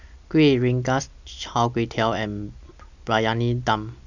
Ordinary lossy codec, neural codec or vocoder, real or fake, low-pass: none; none; real; 7.2 kHz